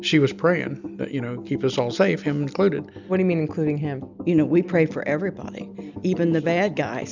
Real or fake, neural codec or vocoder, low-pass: real; none; 7.2 kHz